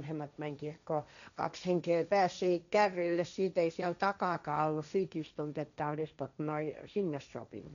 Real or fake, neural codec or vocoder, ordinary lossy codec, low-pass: fake; codec, 16 kHz, 1.1 kbps, Voila-Tokenizer; none; 7.2 kHz